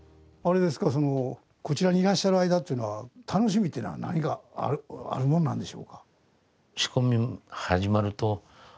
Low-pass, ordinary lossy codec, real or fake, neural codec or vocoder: none; none; real; none